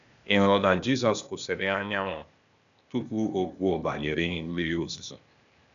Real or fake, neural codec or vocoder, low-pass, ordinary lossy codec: fake; codec, 16 kHz, 0.8 kbps, ZipCodec; 7.2 kHz; none